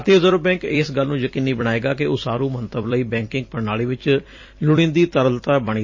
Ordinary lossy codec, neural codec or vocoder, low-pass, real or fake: MP3, 32 kbps; vocoder, 44.1 kHz, 128 mel bands every 256 samples, BigVGAN v2; 7.2 kHz; fake